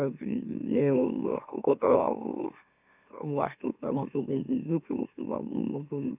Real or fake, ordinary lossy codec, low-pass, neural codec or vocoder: fake; none; 3.6 kHz; autoencoder, 44.1 kHz, a latent of 192 numbers a frame, MeloTTS